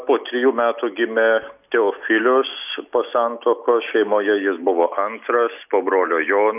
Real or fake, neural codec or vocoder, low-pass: real; none; 3.6 kHz